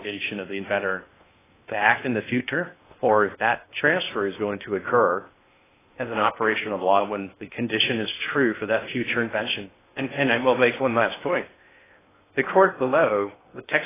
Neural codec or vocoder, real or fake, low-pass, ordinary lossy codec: codec, 16 kHz in and 24 kHz out, 0.6 kbps, FocalCodec, streaming, 2048 codes; fake; 3.6 kHz; AAC, 16 kbps